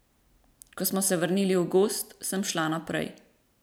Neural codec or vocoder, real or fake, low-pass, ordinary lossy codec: vocoder, 44.1 kHz, 128 mel bands every 512 samples, BigVGAN v2; fake; none; none